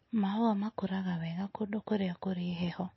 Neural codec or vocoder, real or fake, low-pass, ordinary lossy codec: none; real; 7.2 kHz; MP3, 24 kbps